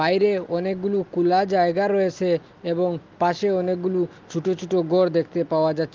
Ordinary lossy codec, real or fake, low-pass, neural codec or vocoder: Opus, 32 kbps; real; 7.2 kHz; none